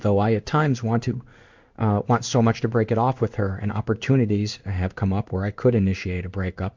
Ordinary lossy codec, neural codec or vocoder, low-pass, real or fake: MP3, 64 kbps; codec, 16 kHz in and 24 kHz out, 1 kbps, XY-Tokenizer; 7.2 kHz; fake